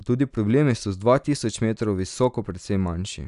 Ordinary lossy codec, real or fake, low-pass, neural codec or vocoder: none; real; 10.8 kHz; none